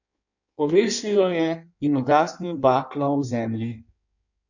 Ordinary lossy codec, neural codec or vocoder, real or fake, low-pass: none; codec, 16 kHz in and 24 kHz out, 1.1 kbps, FireRedTTS-2 codec; fake; 7.2 kHz